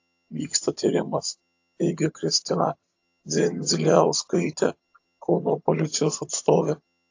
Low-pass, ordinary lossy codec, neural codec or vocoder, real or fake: 7.2 kHz; AAC, 48 kbps; vocoder, 22.05 kHz, 80 mel bands, HiFi-GAN; fake